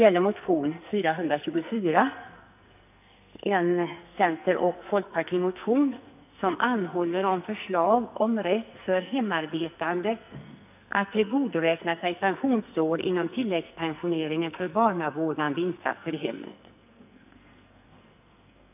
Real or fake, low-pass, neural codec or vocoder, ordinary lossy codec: fake; 3.6 kHz; codec, 44.1 kHz, 2.6 kbps, SNAC; none